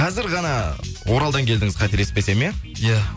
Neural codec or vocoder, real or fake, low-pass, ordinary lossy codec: none; real; none; none